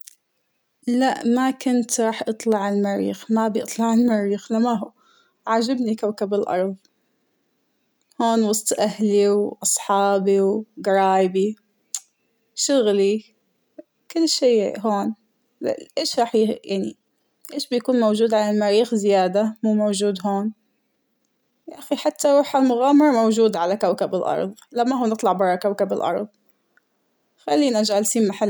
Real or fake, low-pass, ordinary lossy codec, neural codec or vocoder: real; none; none; none